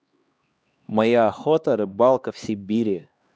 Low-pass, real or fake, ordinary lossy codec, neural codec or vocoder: none; fake; none; codec, 16 kHz, 2 kbps, X-Codec, HuBERT features, trained on LibriSpeech